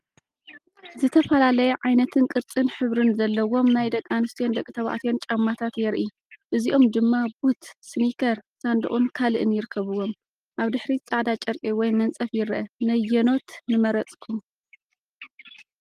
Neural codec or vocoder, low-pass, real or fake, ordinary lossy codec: none; 14.4 kHz; real; Opus, 32 kbps